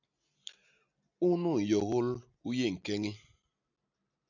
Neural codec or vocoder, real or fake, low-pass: none; real; 7.2 kHz